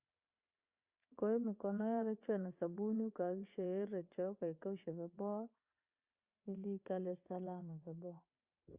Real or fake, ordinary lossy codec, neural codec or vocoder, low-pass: fake; Opus, 64 kbps; vocoder, 22.05 kHz, 80 mel bands, WaveNeXt; 3.6 kHz